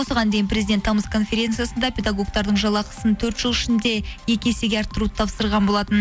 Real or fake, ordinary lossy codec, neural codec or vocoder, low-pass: real; none; none; none